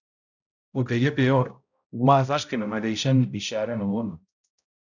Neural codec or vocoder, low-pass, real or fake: codec, 16 kHz, 0.5 kbps, X-Codec, HuBERT features, trained on balanced general audio; 7.2 kHz; fake